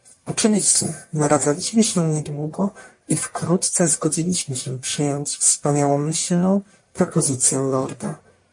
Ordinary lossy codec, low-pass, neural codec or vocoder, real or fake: MP3, 48 kbps; 10.8 kHz; codec, 44.1 kHz, 1.7 kbps, Pupu-Codec; fake